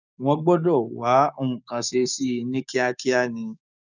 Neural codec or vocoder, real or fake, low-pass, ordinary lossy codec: codec, 16 kHz, 6 kbps, DAC; fake; 7.2 kHz; none